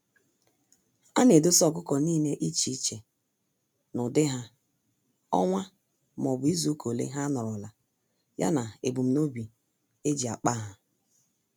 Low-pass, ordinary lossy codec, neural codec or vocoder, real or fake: none; none; none; real